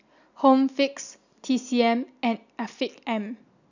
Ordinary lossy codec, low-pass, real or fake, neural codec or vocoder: none; 7.2 kHz; real; none